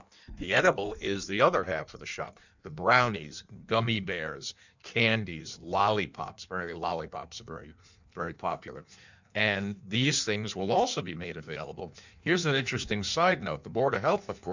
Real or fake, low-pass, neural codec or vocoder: fake; 7.2 kHz; codec, 16 kHz in and 24 kHz out, 1.1 kbps, FireRedTTS-2 codec